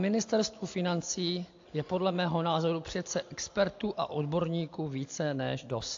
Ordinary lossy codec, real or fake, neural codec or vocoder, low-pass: AAC, 48 kbps; real; none; 7.2 kHz